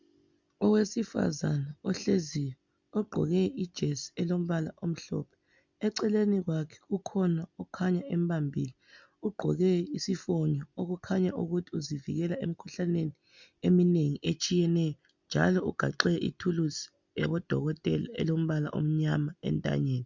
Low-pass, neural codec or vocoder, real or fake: 7.2 kHz; none; real